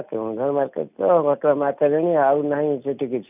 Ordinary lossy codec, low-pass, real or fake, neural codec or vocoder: none; 3.6 kHz; real; none